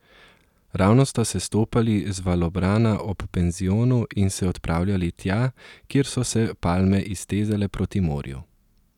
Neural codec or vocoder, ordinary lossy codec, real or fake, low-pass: none; none; real; 19.8 kHz